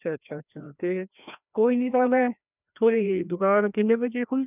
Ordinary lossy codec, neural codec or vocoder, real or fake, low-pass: none; codec, 16 kHz, 1 kbps, FreqCodec, larger model; fake; 3.6 kHz